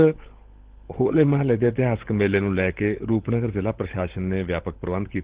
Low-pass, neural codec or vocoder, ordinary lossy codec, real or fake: 3.6 kHz; codec, 16 kHz, 16 kbps, FunCodec, trained on LibriTTS, 50 frames a second; Opus, 16 kbps; fake